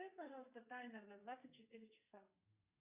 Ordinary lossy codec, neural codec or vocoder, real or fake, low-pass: Opus, 64 kbps; codec, 32 kHz, 1.9 kbps, SNAC; fake; 3.6 kHz